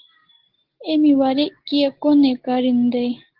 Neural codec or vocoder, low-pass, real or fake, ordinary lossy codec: none; 5.4 kHz; real; Opus, 16 kbps